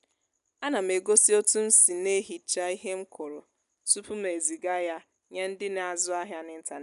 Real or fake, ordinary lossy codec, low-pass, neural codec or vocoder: real; none; 10.8 kHz; none